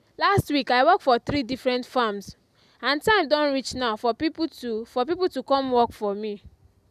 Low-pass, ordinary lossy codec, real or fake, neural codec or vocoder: 14.4 kHz; none; real; none